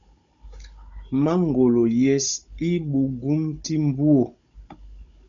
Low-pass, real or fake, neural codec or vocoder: 7.2 kHz; fake; codec, 16 kHz, 16 kbps, FunCodec, trained on Chinese and English, 50 frames a second